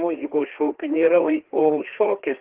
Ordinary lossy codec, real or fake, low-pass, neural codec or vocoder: Opus, 16 kbps; fake; 3.6 kHz; codec, 16 kHz, 2 kbps, FreqCodec, larger model